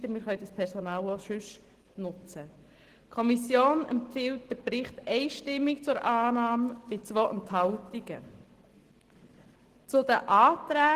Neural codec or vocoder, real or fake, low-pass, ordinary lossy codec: none; real; 14.4 kHz; Opus, 16 kbps